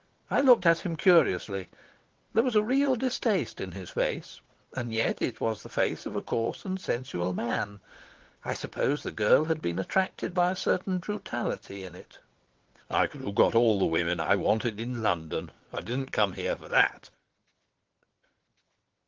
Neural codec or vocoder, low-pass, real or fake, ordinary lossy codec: vocoder, 22.05 kHz, 80 mel bands, WaveNeXt; 7.2 kHz; fake; Opus, 16 kbps